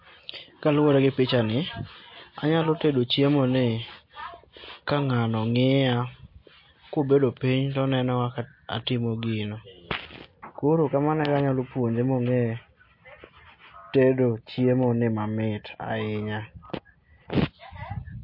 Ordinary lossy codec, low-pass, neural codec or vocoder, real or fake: MP3, 32 kbps; 5.4 kHz; none; real